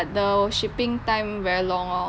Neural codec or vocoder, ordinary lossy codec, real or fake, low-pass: none; none; real; none